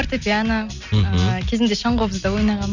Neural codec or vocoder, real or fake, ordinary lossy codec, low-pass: none; real; none; 7.2 kHz